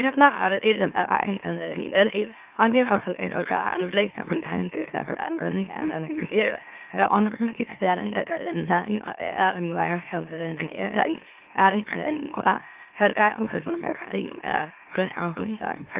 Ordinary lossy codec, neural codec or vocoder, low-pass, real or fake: Opus, 24 kbps; autoencoder, 44.1 kHz, a latent of 192 numbers a frame, MeloTTS; 3.6 kHz; fake